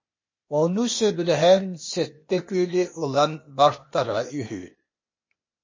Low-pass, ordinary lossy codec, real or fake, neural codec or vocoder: 7.2 kHz; MP3, 32 kbps; fake; codec, 16 kHz, 0.8 kbps, ZipCodec